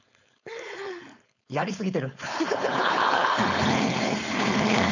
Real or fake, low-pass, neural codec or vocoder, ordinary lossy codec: fake; 7.2 kHz; codec, 16 kHz, 4.8 kbps, FACodec; none